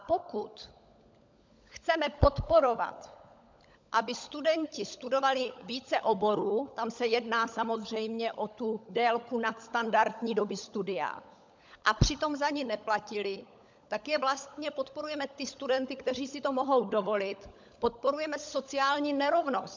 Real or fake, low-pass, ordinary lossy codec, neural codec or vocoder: fake; 7.2 kHz; MP3, 64 kbps; codec, 16 kHz, 16 kbps, FunCodec, trained on LibriTTS, 50 frames a second